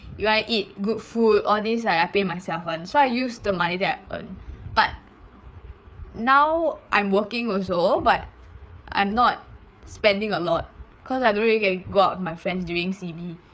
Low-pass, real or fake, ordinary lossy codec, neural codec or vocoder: none; fake; none; codec, 16 kHz, 4 kbps, FreqCodec, larger model